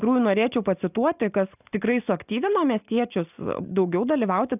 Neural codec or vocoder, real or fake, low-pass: none; real; 3.6 kHz